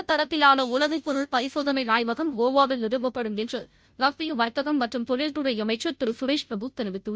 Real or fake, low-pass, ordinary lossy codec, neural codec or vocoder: fake; none; none; codec, 16 kHz, 0.5 kbps, FunCodec, trained on Chinese and English, 25 frames a second